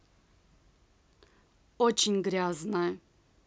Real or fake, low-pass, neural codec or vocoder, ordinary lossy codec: real; none; none; none